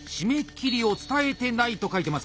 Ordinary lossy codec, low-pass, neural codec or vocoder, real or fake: none; none; none; real